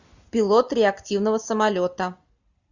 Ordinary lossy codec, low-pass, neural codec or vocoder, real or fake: Opus, 64 kbps; 7.2 kHz; none; real